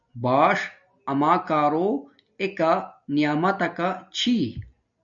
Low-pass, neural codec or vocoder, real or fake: 7.2 kHz; none; real